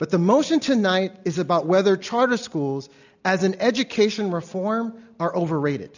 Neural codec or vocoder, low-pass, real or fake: none; 7.2 kHz; real